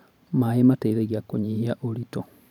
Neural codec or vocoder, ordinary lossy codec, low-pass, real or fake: vocoder, 44.1 kHz, 128 mel bands every 512 samples, BigVGAN v2; none; 19.8 kHz; fake